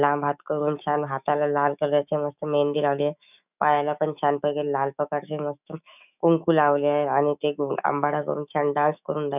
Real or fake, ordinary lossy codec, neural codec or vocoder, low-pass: fake; none; autoencoder, 48 kHz, 128 numbers a frame, DAC-VAE, trained on Japanese speech; 3.6 kHz